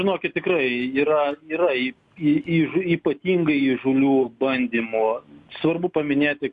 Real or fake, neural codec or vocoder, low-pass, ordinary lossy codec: real; none; 10.8 kHz; MP3, 64 kbps